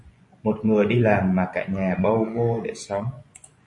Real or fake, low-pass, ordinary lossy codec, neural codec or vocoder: fake; 10.8 kHz; MP3, 48 kbps; vocoder, 24 kHz, 100 mel bands, Vocos